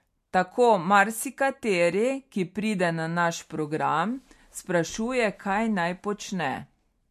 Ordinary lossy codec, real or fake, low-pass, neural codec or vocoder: MP3, 64 kbps; real; 14.4 kHz; none